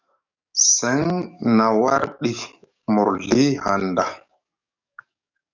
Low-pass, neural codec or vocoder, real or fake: 7.2 kHz; codec, 44.1 kHz, 7.8 kbps, DAC; fake